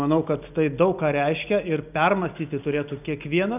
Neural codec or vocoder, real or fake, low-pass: none; real; 3.6 kHz